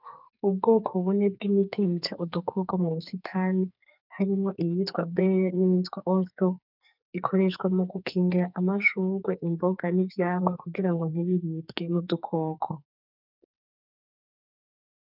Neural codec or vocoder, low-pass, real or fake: codec, 44.1 kHz, 2.6 kbps, SNAC; 5.4 kHz; fake